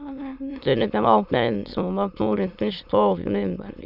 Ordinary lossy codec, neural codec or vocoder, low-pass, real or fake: none; autoencoder, 22.05 kHz, a latent of 192 numbers a frame, VITS, trained on many speakers; 5.4 kHz; fake